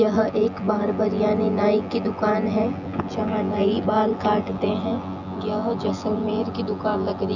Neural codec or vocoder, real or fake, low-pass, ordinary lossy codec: vocoder, 24 kHz, 100 mel bands, Vocos; fake; 7.2 kHz; none